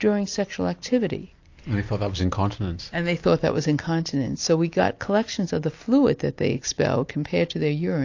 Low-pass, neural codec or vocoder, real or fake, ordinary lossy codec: 7.2 kHz; none; real; AAC, 48 kbps